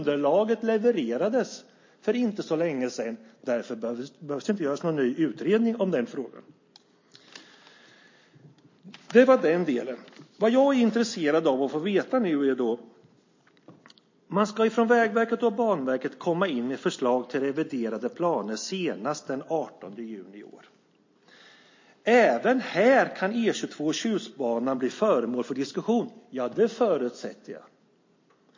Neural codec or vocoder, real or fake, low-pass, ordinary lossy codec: none; real; 7.2 kHz; MP3, 32 kbps